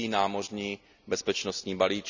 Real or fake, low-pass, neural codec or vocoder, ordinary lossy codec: real; 7.2 kHz; none; none